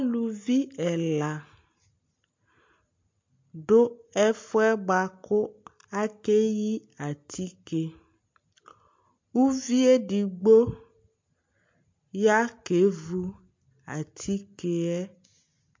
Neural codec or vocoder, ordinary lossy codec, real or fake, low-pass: none; MP3, 48 kbps; real; 7.2 kHz